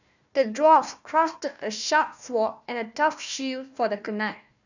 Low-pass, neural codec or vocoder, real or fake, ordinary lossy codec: 7.2 kHz; codec, 16 kHz, 1 kbps, FunCodec, trained on Chinese and English, 50 frames a second; fake; none